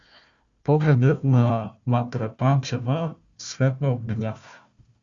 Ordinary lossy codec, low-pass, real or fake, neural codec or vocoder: Opus, 64 kbps; 7.2 kHz; fake; codec, 16 kHz, 1 kbps, FunCodec, trained on Chinese and English, 50 frames a second